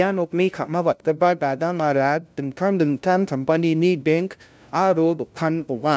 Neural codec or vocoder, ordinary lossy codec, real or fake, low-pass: codec, 16 kHz, 0.5 kbps, FunCodec, trained on LibriTTS, 25 frames a second; none; fake; none